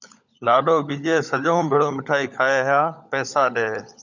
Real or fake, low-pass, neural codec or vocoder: fake; 7.2 kHz; codec, 16 kHz, 16 kbps, FunCodec, trained on LibriTTS, 50 frames a second